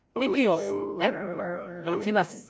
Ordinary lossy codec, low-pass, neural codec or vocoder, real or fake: none; none; codec, 16 kHz, 0.5 kbps, FreqCodec, larger model; fake